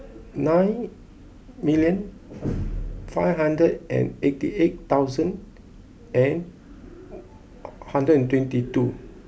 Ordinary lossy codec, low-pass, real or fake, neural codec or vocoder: none; none; real; none